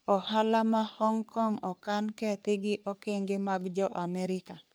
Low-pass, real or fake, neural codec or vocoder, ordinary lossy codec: none; fake; codec, 44.1 kHz, 3.4 kbps, Pupu-Codec; none